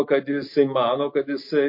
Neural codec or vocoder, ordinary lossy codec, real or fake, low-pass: none; MP3, 32 kbps; real; 5.4 kHz